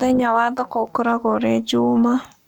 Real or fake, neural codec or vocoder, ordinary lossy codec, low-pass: fake; codec, 44.1 kHz, 7.8 kbps, Pupu-Codec; none; 19.8 kHz